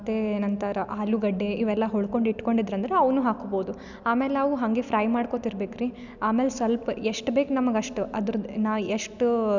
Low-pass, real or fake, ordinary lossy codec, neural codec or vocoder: 7.2 kHz; real; none; none